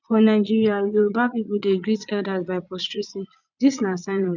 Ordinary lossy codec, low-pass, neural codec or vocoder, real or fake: none; 7.2 kHz; vocoder, 22.05 kHz, 80 mel bands, Vocos; fake